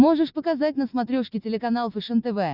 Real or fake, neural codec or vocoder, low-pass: real; none; 5.4 kHz